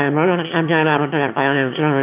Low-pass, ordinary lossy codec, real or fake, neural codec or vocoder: 3.6 kHz; AAC, 32 kbps; fake; autoencoder, 22.05 kHz, a latent of 192 numbers a frame, VITS, trained on one speaker